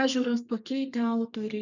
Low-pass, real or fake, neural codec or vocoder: 7.2 kHz; fake; codec, 32 kHz, 1.9 kbps, SNAC